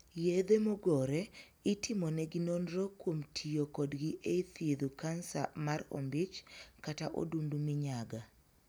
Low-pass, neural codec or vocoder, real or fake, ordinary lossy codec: none; none; real; none